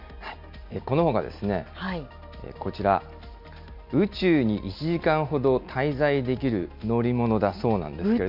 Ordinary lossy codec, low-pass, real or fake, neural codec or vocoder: MP3, 48 kbps; 5.4 kHz; real; none